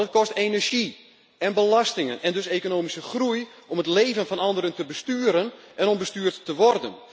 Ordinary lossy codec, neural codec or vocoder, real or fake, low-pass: none; none; real; none